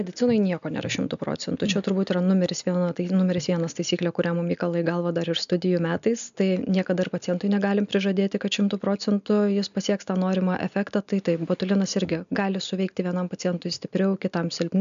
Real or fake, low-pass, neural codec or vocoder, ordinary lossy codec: real; 7.2 kHz; none; AAC, 96 kbps